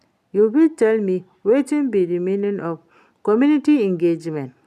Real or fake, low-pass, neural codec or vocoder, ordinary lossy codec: real; 14.4 kHz; none; none